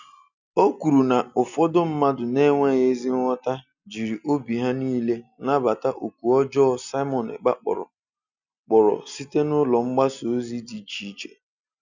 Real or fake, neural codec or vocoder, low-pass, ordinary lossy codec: real; none; 7.2 kHz; none